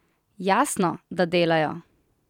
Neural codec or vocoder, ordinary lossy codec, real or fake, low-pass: vocoder, 44.1 kHz, 128 mel bands every 512 samples, BigVGAN v2; none; fake; 19.8 kHz